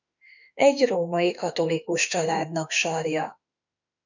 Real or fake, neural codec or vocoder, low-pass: fake; autoencoder, 48 kHz, 32 numbers a frame, DAC-VAE, trained on Japanese speech; 7.2 kHz